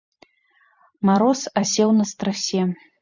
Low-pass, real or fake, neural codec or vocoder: 7.2 kHz; fake; vocoder, 44.1 kHz, 128 mel bands every 256 samples, BigVGAN v2